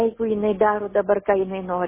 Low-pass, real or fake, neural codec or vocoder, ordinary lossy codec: 3.6 kHz; real; none; MP3, 16 kbps